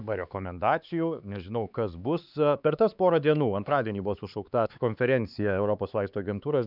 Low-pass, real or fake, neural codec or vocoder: 5.4 kHz; fake; codec, 16 kHz, 4 kbps, X-Codec, HuBERT features, trained on LibriSpeech